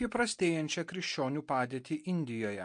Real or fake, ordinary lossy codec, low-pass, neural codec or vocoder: real; MP3, 48 kbps; 9.9 kHz; none